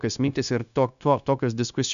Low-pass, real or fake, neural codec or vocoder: 7.2 kHz; fake; codec, 16 kHz, 0.9 kbps, LongCat-Audio-Codec